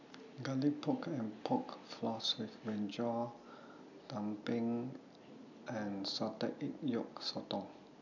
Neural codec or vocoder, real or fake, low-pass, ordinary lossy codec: none; real; 7.2 kHz; none